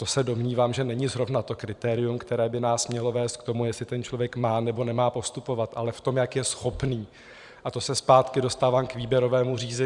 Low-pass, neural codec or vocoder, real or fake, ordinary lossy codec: 10.8 kHz; vocoder, 44.1 kHz, 128 mel bands every 512 samples, BigVGAN v2; fake; Opus, 64 kbps